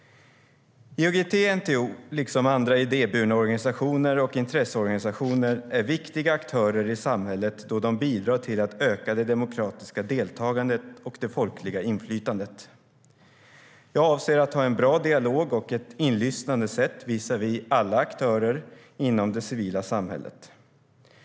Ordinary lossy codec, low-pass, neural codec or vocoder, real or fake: none; none; none; real